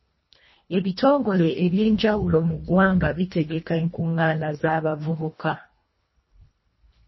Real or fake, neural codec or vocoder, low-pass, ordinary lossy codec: fake; codec, 24 kHz, 1.5 kbps, HILCodec; 7.2 kHz; MP3, 24 kbps